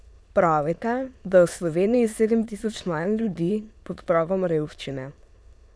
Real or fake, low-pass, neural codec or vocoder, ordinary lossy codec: fake; none; autoencoder, 22.05 kHz, a latent of 192 numbers a frame, VITS, trained on many speakers; none